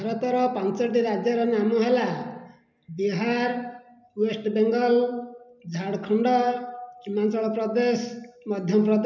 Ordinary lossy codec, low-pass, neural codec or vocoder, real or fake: none; 7.2 kHz; none; real